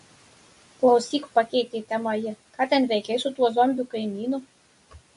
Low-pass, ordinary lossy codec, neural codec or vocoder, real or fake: 10.8 kHz; MP3, 48 kbps; none; real